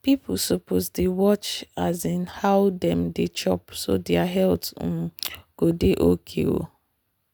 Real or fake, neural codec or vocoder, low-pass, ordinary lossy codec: real; none; none; none